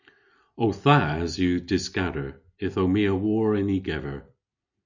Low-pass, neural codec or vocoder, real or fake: 7.2 kHz; none; real